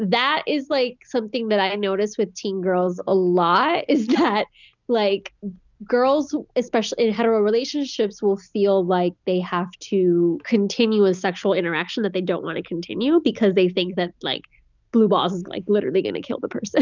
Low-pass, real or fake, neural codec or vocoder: 7.2 kHz; real; none